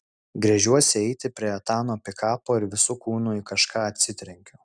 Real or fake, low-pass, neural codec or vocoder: real; 9.9 kHz; none